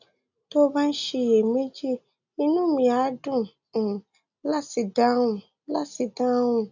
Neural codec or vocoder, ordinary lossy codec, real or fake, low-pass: none; none; real; 7.2 kHz